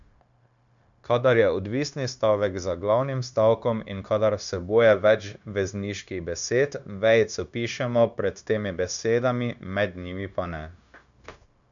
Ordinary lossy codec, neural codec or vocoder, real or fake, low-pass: none; codec, 16 kHz, 0.9 kbps, LongCat-Audio-Codec; fake; 7.2 kHz